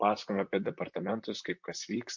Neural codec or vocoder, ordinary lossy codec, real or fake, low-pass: vocoder, 44.1 kHz, 128 mel bands, Pupu-Vocoder; MP3, 48 kbps; fake; 7.2 kHz